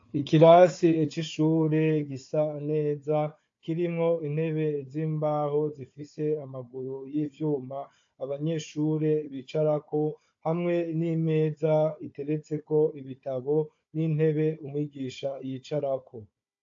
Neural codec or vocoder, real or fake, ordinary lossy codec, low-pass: codec, 16 kHz, 4 kbps, FunCodec, trained on Chinese and English, 50 frames a second; fake; AAC, 48 kbps; 7.2 kHz